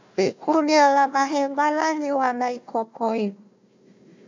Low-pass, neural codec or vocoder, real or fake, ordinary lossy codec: 7.2 kHz; codec, 16 kHz, 1 kbps, FunCodec, trained on Chinese and English, 50 frames a second; fake; MP3, 64 kbps